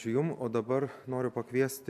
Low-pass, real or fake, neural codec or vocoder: 14.4 kHz; real; none